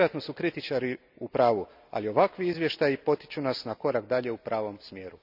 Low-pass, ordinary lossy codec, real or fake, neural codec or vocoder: 5.4 kHz; none; real; none